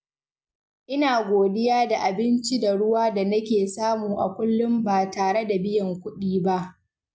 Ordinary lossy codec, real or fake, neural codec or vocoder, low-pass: none; real; none; none